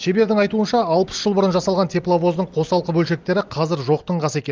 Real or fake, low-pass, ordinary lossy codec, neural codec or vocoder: real; 7.2 kHz; Opus, 32 kbps; none